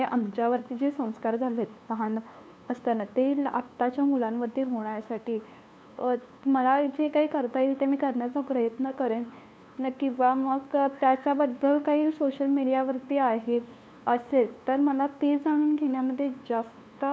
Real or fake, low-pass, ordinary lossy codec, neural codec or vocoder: fake; none; none; codec, 16 kHz, 2 kbps, FunCodec, trained on LibriTTS, 25 frames a second